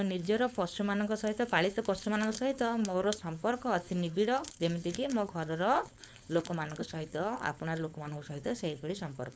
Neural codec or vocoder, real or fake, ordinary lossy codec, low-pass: codec, 16 kHz, 4.8 kbps, FACodec; fake; none; none